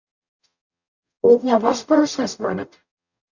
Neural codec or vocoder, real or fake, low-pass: codec, 44.1 kHz, 0.9 kbps, DAC; fake; 7.2 kHz